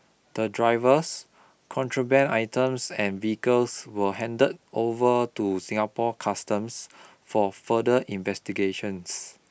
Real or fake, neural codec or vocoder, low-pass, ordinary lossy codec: real; none; none; none